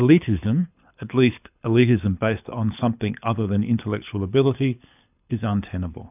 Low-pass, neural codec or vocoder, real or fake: 3.6 kHz; codec, 24 kHz, 6 kbps, HILCodec; fake